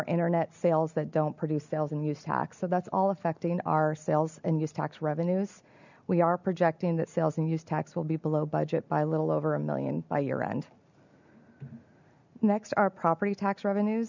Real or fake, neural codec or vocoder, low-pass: real; none; 7.2 kHz